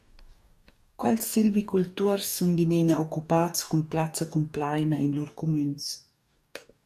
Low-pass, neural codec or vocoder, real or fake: 14.4 kHz; codec, 44.1 kHz, 2.6 kbps, DAC; fake